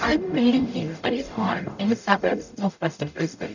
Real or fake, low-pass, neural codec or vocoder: fake; 7.2 kHz; codec, 44.1 kHz, 0.9 kbps, DAC